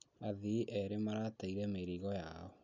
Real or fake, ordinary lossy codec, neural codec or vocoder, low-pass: real; none; none; 7.2 kHz